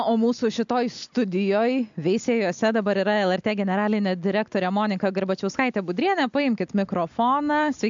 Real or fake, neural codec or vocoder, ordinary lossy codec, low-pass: real; none; MP3, 64 kbps; 7.2 kHz